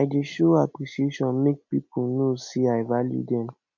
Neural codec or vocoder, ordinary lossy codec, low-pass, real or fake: none; none; 7.2 kHz; real